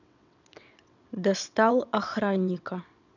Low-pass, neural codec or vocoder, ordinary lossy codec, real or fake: 7.2 kHz; vocoder, 22.05 kHz, 80 mel bands, WaveNeXt; none; fake